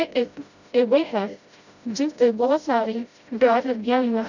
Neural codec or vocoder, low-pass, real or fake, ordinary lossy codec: codec, 16 kHz, 0.5 kbps, FreqCodec, smaller model; 7.2 kHz; fake; none